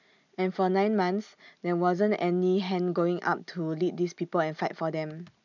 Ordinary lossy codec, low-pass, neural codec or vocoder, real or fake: none; 7.2 kHz; none; real